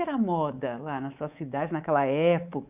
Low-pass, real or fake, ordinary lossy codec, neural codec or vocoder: 3.6 kHz; fake; none; codec, 24 kHz, 3.1 kbps, DualCodec